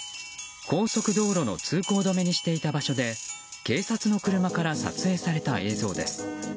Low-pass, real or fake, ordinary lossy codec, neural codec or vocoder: none; real; none; none